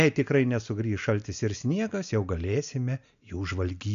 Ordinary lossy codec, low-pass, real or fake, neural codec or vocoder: AAC, 96 kbps; 7.2 kHz; real; none